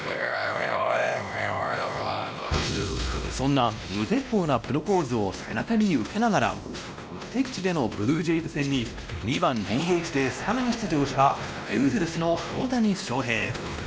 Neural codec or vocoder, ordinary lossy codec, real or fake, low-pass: codec, 16 kHz, 1 kbps, X-Codec, WavLM features, trained on Multilingual LibriSpeech; none; fake; none